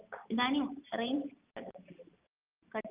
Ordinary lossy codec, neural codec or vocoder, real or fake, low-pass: Opus, 32 kbps; none; real; 3.6 kHz